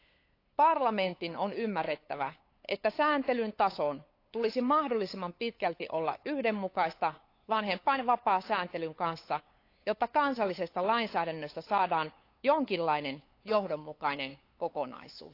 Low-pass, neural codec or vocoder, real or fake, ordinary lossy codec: 5.4 kHz; codec, 16 kHz, 8 kbps, FunCodec, trained on LibriTTS, 25 frames a second; fake; AAC, 32 kbps